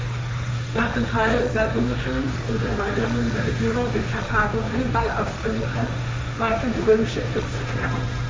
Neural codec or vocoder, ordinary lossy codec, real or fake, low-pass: codec, 16 kHz, 1.1 kbps, Voila-Tokenizer; none; fake; none